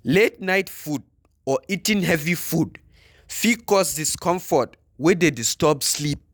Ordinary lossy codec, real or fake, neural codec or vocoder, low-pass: none; real; none; none